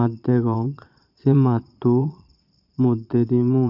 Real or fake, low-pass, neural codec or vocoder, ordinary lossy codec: fake; 5.4 kHz; vocoder, 22.05 kHz, 80 mel bands, Vocos; none